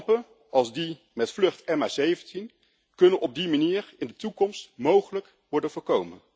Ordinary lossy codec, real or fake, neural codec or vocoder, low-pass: none; real; none; none